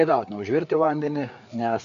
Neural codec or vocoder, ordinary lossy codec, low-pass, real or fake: codec, 16 kHz, 4 kbps, FreqCodec, larger model; MP3, 64 kbps; 7.2 kHz; fake